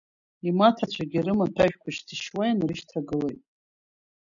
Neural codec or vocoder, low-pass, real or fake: none; 7.2 kHz; real